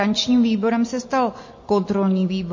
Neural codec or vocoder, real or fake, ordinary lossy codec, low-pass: none; real; MP3, 32 kbps; 7.2 kHz